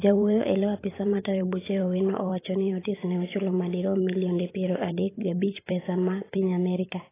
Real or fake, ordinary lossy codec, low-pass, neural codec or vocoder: fake; AAC, 16 kbps; 3.6 kHz; vocoder, 44.1 kHz, 128 mel bands every 512 samples, BigVGAN v2